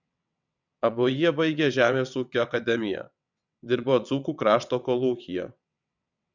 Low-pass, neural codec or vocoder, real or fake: 7.2 kHz; vocoder, 22.05 kHz, 80 mel bands, WaveNeXt; fake